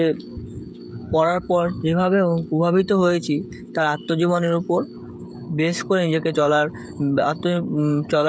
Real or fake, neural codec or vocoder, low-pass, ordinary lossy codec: fake; codec, 16 kHz, 16 kbps, FreqCodec, smaller model; none; none